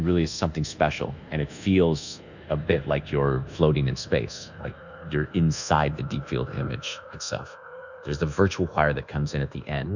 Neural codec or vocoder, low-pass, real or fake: codec, 24 kHz, 1.2 kbps, DualCodec; 7.2 kHz; fake